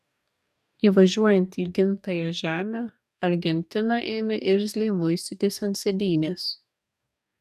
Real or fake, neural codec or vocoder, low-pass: fake; codec, 44.1 kHz, 2.6 kbps, DAC; 14.4 kHz